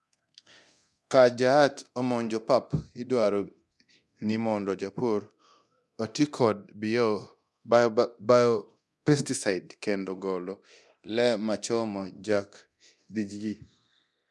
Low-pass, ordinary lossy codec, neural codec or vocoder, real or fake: none; none; codec, 24 kHz, 0.9 kbps, DualCodec; fake